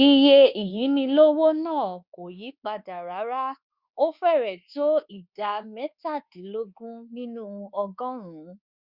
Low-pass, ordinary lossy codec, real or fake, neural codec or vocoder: 5.4 kHz; Opus, 64 kbps; fake; codec, 24 kHz, 1.2 kbps, DualCodec